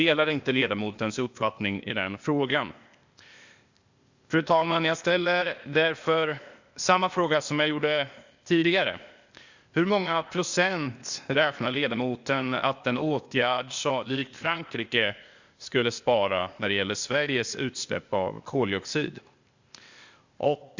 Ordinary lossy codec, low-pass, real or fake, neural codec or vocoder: Opus, 64 kbps; 7.2 kHz; fake; codec, 16 kHz, 0.8 kbps, ZipCodec